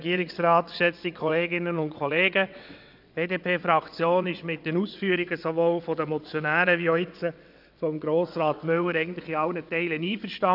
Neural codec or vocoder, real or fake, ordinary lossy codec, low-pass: vocoder, 22.05 kHz, 80 mel bands, WaveNeXt; fake; none; 5.4 kHz